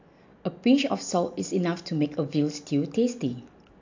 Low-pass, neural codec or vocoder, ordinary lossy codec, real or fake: 7.2 kHz; none; AAC, 48 kbps; real